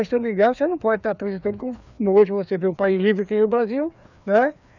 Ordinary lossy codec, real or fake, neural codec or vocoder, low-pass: none; fake; codec, 16 kHz, 2 kbps, FreqCodec, larger model; 7.2 kHz